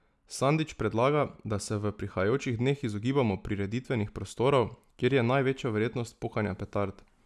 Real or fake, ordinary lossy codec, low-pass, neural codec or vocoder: real; none; none; none